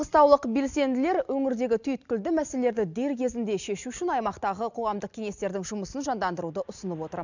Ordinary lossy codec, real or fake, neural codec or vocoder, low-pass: none; real; none; 7.2 kHz